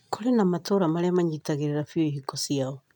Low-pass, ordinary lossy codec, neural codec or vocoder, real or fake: 19.8 kHz; none; none; real